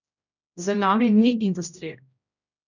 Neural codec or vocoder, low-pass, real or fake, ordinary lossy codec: codec, 16 kHz, 0.5 kbps, X-Codec, HuBERT features, trained on general audio; 7.2 kHz; fake; none